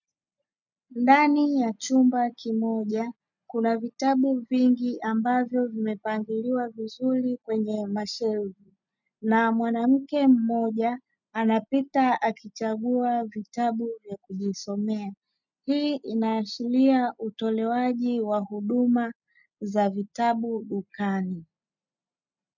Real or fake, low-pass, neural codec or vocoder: real; 7.2 kHz; none